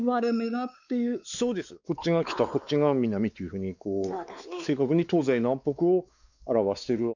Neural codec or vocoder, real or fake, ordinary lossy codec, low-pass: codec, 16 kHz, 4 kbps, X-Codec, WavLM features, trained on Multilingual LibriSpeech; fake; none; 7.2 kHz